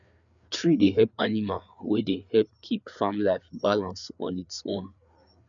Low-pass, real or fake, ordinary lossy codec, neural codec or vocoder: 7.2 kHz; fake; MP3, 96 kbps; codec, 16 kHz, 4 kbps, FreqCodec, larger model